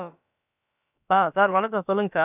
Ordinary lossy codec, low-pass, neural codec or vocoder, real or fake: none; 3.6 kHz; codec, 16 kHz, about 1 kbps, DyCAST, with the encoder's durations; fake